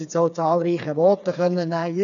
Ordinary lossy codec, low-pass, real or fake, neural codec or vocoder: none; 7.2 kHz; fake; codec, 16 kHz, 4 kbps, FreqCodec, smaller model